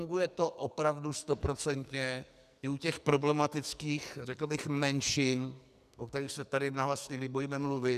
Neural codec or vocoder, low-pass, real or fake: codec, 44.1 kHz, 2.6 kbps, SNAC; 14.4 kHz; fake